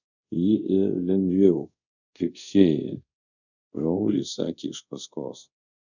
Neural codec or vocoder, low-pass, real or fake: codec, 24 kHz, 0.5 kbps, DualCodec; 7.2 kHz; fake